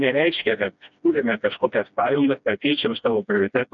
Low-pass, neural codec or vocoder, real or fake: 7.2 kHz; codec, 16 kHz, 1 kbps, FreqCodec, smaller model; fake